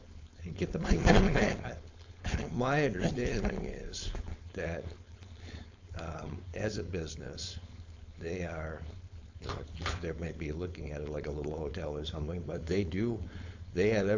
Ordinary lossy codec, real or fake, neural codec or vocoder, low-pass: AAC, 48 kbps; fake; codec, 16 kHz, 4.8 kbps, FACodec; 7.2 kHz